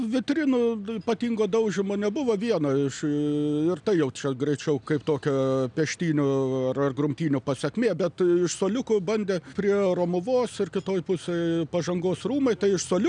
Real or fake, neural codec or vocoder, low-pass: real; none; 9.9 kHz